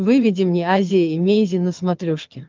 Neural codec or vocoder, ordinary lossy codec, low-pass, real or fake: vocoder, 22.05 kHz, 80 mel bands, HiFi-GAN; Opus, 32 kbps; 7.2 kHz; fake